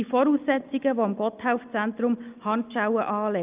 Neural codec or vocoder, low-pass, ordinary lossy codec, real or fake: none; 3.6 kHz; Opus, 24 kbps; real